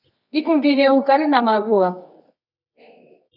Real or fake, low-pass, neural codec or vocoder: fake; 5.4 kHz; codec, 24 kHz, 0.9 kbps, WavTokenizer, medium music audio release